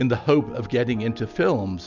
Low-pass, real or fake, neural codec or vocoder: 7.2 kHz; real; none